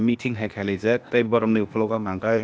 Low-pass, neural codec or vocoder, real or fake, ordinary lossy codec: none; codec, 16 kHz, 0.8 kbps, ZipCodec; fake; none